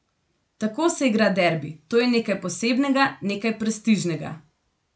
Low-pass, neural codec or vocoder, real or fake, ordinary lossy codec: none; none; real; none